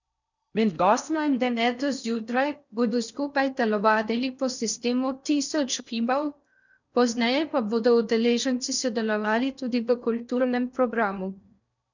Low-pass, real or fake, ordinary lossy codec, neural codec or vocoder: 7.2 kHz; fake; none; codec, 16 kHz in and 24 kHz out, 0.6 kbps, FocalCodec, streaming, 4096 codes